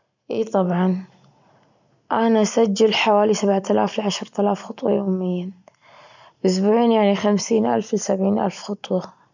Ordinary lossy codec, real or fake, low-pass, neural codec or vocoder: none; real; 7.2 kHz; none